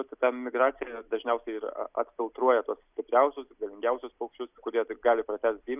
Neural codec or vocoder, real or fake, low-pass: none; real; 3.6 kHz